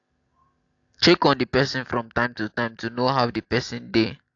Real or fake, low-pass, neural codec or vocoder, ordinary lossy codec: real; 7.2 kHz; none; AAC, 48 kbps